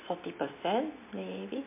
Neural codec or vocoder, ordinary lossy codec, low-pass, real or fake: none; MP3, 24 kbps; 3.6 kHz; real